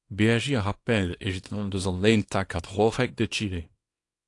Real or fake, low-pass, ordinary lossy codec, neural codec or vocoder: fake; 10.8 kHz; AAC, 48 kbps; codec, 24 kHz, 0.9 kbps, WavTokenizer, small release